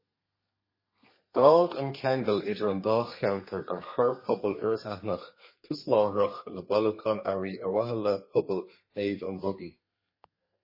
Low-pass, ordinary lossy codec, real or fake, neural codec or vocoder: 5.4 kHz; MP3, 24 kbps; fake; codec, 32 kHz, 1.9 kbps, SNAC